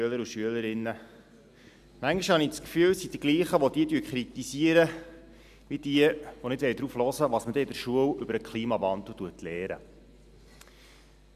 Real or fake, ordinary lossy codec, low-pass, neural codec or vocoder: real; none; 14.4 kHz; none